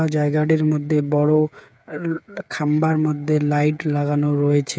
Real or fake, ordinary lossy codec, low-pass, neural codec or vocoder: fake; none; none; codec, 16 kHz, 8 kbps, FreqCodec, smaller model